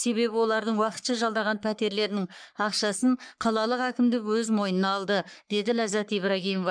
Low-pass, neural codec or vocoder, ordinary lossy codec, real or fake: 9.9 kHz; codec, 44.1 kHz, 3.4 kbps, Pupu-Codec; MP3, 96 kbps; fake